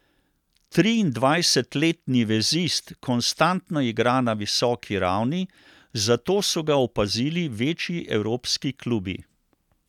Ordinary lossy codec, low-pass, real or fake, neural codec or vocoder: none; 19.8 kHz; real; none